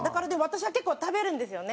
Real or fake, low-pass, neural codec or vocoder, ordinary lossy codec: real; none; none; none